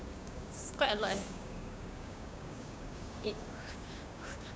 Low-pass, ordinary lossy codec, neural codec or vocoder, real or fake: none; none; codec, 16 kHz, 6 kbps, DAC; fake